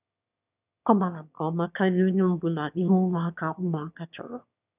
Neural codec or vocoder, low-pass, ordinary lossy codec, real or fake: autoencoder, 22.05 kHz, a latent of 192 numbers a frame, VITS, trained on one speaker; 3.6 kHz; none; fake